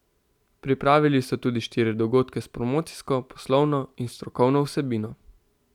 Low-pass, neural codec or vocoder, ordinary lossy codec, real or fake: 19.8 kHz; none; none; real